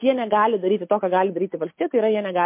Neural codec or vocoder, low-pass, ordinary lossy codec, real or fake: none; 3.6 kHz; MP3, 24 kbps; real